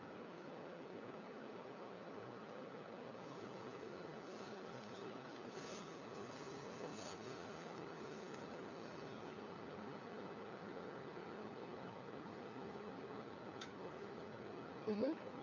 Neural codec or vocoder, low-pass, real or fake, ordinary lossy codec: codec, 16 kHz, 4 kbps, FunCodec, trained on LibriTTS, 50 frames a second; 7.2 kHz; fake; none